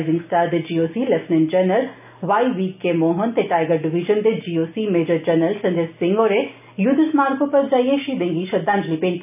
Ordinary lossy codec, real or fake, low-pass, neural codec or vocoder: none; real; 3.6 kHz; none